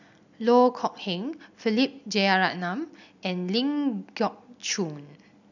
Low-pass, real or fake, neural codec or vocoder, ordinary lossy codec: 7.2 kHz; real; none; none